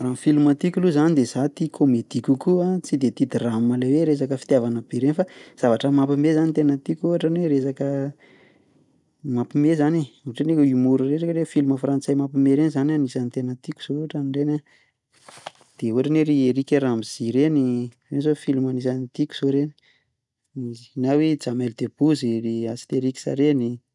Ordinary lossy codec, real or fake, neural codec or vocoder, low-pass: none; real; none; 10.8 kHz